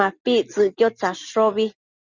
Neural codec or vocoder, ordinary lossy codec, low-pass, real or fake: none; Opus, 64 kbps; 7.2 kHz; real